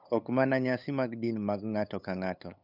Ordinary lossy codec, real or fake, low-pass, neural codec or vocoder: none; fake; 5.4 kHz; codec, 16 kHz, 8 kbps, FunCodec, trained on LibriTTS, 25 frames a second